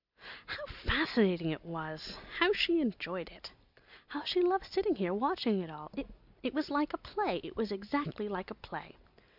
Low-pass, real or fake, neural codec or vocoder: 5.4 kHz; real; none